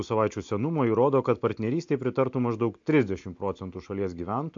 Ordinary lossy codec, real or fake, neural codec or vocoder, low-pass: AAC, 64 kbps; real; none; 7.2 kHz